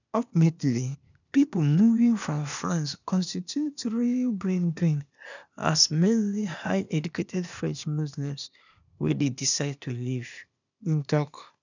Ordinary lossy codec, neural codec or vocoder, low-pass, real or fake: none; codec, 16 kHz, 0.8 kbps, ZipCodec; 7.2 kHz; fake